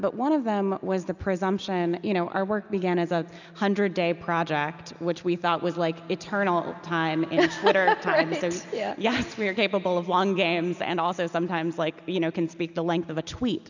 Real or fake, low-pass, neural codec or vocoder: real; 7.2 kHz; none